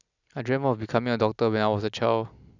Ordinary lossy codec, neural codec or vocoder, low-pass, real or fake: none; none; 7.2 kHz; real